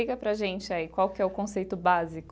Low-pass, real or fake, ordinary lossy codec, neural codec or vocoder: none; real; none; none